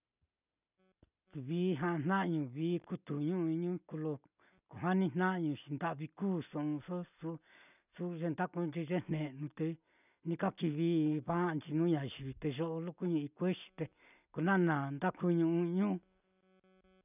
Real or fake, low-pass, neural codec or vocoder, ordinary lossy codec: real; 3.6 kHz; none; none